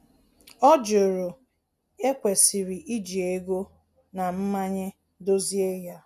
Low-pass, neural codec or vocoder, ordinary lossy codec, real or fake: 14.4 kHz; none; none; real